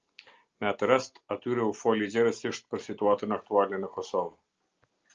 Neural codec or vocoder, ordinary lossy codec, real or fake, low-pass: none; Opus, 24 kbps; real; 7.2 kHz